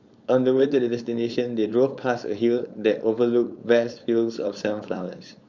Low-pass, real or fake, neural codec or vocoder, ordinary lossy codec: 7.2 kHz; fake; codec, 16 kHz, 4.8 kbps, FACodec; Opus, 64 kbps